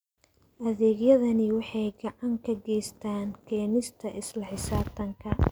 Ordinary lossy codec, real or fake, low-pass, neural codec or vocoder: none; real; none; none